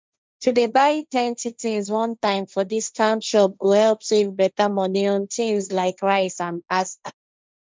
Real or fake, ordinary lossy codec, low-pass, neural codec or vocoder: fake; none; none; codec, 16 kHz, 1.1 kbps, Voila-Tokenizer